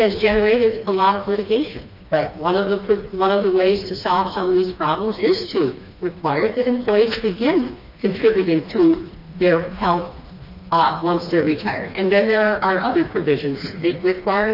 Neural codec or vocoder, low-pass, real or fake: codec, 16 kHz, 2 kbps, FreqCodec, smaller model; 5.4 kHz; fake